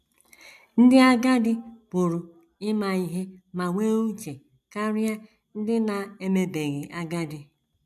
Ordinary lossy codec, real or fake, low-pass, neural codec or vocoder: none; real; 14.4 kHz; none